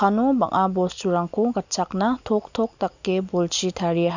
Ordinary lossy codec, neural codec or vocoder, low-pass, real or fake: none; none; 7.2 kHz; real